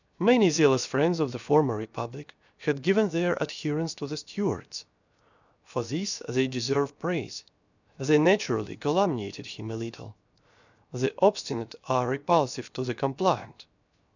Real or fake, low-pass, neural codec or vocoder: fake; 7.2 kHz; codec, 16 kHz, 0.7 kbps, FocalCodec